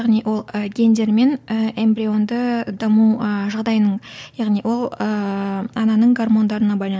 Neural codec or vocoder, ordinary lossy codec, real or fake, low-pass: none; none; real; none